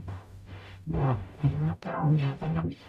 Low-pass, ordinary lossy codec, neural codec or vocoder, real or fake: 14.4 kHz; none; codec, 44.1 kHz, 0.9 kbps, DAC; fake